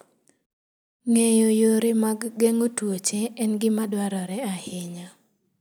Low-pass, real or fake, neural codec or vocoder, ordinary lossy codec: none; real; none; none